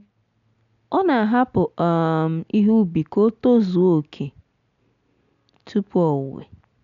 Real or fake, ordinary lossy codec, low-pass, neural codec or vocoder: real; none; 7.2 kHz; none